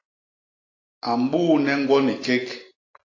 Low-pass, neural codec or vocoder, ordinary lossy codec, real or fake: 7.2 kHz; none; AAC, 32 kbps; real